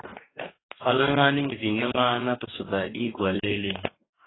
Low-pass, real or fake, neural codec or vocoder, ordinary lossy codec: 7.2 kHz; fake; codec, 44.1 kHz, 2.6 kbps, DAC; AAC, 16 kbps